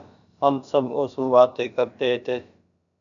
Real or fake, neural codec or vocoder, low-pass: fake; codec, 16 kHz, about 1 kbps, DyCAST, with the encoder's durations; 7.2 kHz